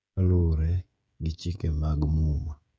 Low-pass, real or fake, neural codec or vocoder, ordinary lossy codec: none; fake; codec, 16 kHz, 16 kbps, FreqCodec, smaller model; none